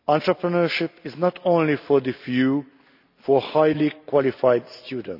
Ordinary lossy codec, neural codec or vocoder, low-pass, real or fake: none; none; 5.4 kHz; real